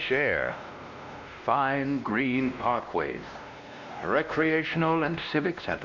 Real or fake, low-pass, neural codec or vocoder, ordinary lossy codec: fake; 7.2 kHz; codec, 16 kHz, 1 kbps, X-Codec, WavLM features, trained on Multilingual LibriSpeech; Opus, 64 kbps